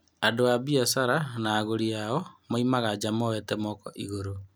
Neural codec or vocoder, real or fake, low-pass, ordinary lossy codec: none; real; none; none